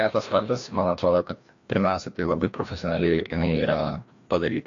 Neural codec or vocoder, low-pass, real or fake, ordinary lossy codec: codec, 16 kHz, 1 kbps, FreqCodec, larger model; 7.2 kHz; fake; AAC, 48 kbps